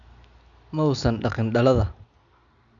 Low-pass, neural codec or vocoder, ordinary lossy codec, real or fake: 7.2 kHz; none; none; real